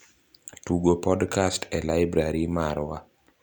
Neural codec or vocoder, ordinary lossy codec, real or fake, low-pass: none; none; real; 19.8 kHz